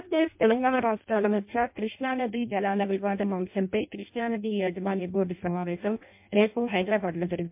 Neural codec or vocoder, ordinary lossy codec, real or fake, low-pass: codec, 16 kHz in and 24 kHz out, 0.6 kbps, FireRedTTS-2 codec; MP3, 32 kbps; fake; 3.6 kHz